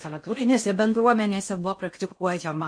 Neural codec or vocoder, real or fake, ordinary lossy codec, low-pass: codec, 16 kHz in and 24 kHz out, 0.6 kbps, FocalCodec, streaming, 2048 codes; fake; MP3, 48 kbps; 9.9 kHz